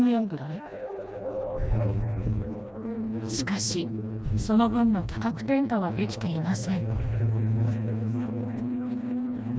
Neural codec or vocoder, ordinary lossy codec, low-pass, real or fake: codec, 16 kHz, 1 kbps, FreqCodec, smaller model; none; none; fake